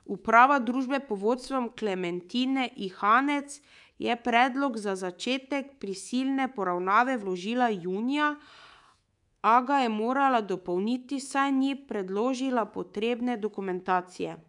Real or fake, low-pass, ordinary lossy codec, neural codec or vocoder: fake; 10.8 kHz; none; codec, 24 kHz, 3.1 kbps, DualCodec